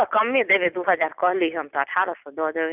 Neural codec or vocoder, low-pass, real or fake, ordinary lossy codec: none; 3.6 kHz; real; none